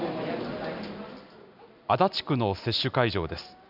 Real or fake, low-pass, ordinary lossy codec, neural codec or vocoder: real; 5.4 kHz; none; none